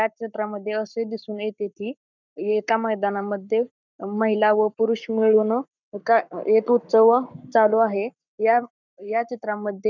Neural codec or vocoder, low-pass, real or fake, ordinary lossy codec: codec, 44.1 kHz, 7.8 kbps, Pupu-Codec; 7.2 kHz; fake; none